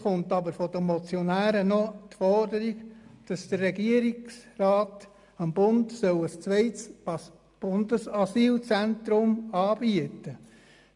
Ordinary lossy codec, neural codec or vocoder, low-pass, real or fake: MP3, 96 kbps; none; 10.8 kHz; real